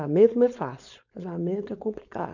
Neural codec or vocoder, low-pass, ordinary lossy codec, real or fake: codec, 16 kHz, 4.8 kbps, FACodec; 7.2 kHz; none; fake